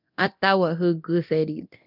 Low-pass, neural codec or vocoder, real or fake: 5.4 kHz; codec, 24 kHz, 0.9 kbps, DualCodec; fake